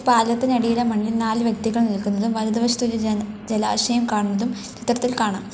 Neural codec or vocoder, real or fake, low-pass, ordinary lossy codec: none; real; none; none